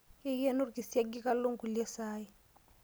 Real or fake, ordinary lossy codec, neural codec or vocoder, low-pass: real; none; none; none